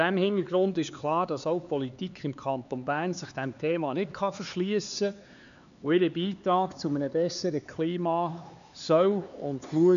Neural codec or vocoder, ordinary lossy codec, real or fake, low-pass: codec, 16 kHz, 4 kbps, X-Codec, HuBERT features, trained on LibriSpeech; none; fake; 7.2 kHz